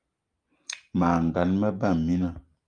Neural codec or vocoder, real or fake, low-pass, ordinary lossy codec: none; real; 9.9 kHz; Opus, 32 kbps